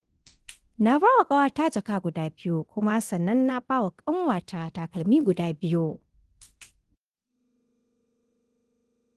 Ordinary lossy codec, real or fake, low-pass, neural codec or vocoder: Opus, 16 kbps; fake; 10.8 kHz; codec, 24 kHz, 0.9 kbps, DualCodec